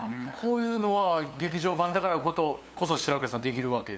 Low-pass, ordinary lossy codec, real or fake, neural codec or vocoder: none; none; fake; codec, 16 kHz, 2 kbps, FunCodec, trained on LibriTTS, 25 frames a second